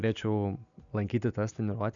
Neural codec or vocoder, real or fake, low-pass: none; real; 7.2 kHz